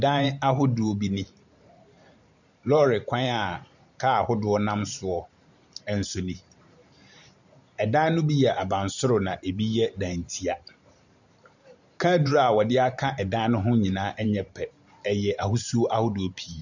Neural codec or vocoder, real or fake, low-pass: vocoder, 44.1 kHz, 128 mel bands every 512 samples, BigVGAN v2; fake; 7.2 kHz